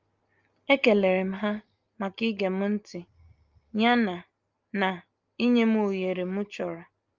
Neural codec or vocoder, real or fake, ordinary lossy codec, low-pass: none; real; Opus, 32 kbps; 7.2 kHz